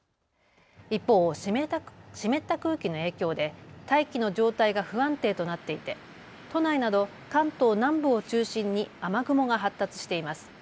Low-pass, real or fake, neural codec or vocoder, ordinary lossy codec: none; real; none; none